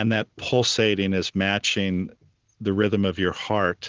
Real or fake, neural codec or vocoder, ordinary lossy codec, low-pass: real; none; Opus, 16 kbps; 7.2 kHz